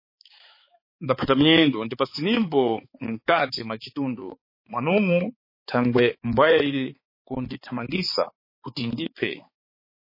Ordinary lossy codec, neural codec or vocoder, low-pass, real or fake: MP3, 24 kbps; codec, 16 kHz, 4 kbps, X-Codec, HuBERT features, trained on balanced general audio; 5.4 kHz; fake